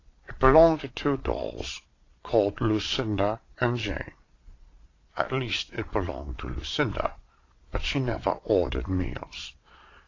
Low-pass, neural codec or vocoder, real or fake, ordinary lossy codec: 7.2 kHz; vocoder, 22.05 kHz, 80 mel bands, Vocos; fake; AAC, 32 kbps